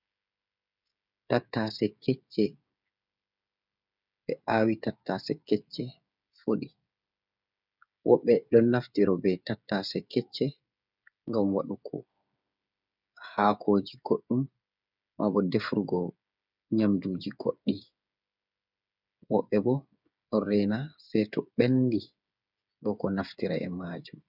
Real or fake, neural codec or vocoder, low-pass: fake; codec, 16 kHz, 8 kbps, FreqCodec, smaller model; 5.4 kHz